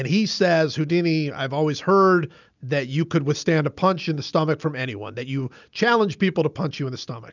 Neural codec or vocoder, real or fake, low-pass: none; real; 7.2 kHz